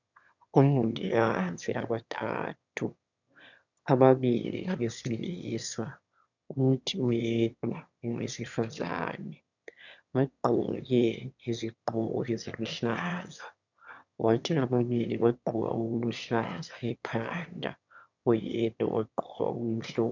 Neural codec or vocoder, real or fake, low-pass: autoencoder, 22.05 kHz, a latent of 192 numbers a frame, VITS, trained on one speaker; fake; 7.2 kHz